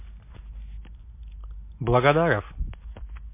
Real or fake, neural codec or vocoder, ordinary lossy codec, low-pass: real; none; MP3, 24 kbps; 3.6 kHz